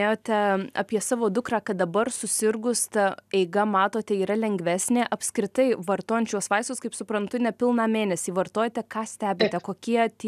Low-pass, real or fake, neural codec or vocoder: 14.4 kHz; real; none